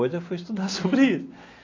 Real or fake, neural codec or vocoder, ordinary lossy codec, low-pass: real; none; AAC, 32 kbps; 7.2 kHz